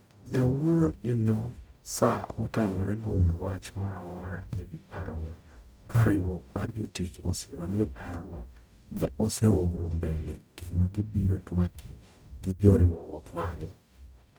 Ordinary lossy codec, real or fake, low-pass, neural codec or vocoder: none; fake; none; codec, 44.1 kHz, 0.9 kbps, DAC